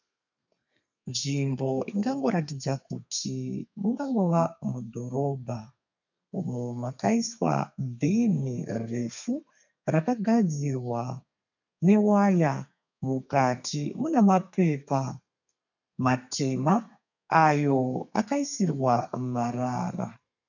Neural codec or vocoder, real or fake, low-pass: codec, 32 kHz, 1.9 kbps, SNAC; fake; 7.2 kHz